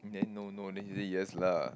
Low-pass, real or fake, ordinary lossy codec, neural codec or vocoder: none; real; none; none